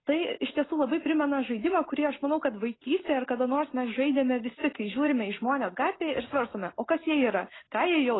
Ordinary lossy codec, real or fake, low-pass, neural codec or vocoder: AAC, 16 kbps; real; 7.2 kHz; none